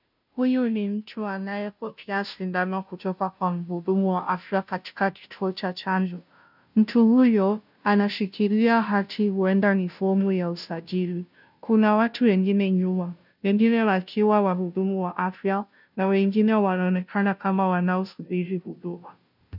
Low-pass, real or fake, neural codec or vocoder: 5.4 kHz; fake; codec, 16 kHz, 0.5 kbps, FunCodec, trained on Chinese and English, 25 frames a second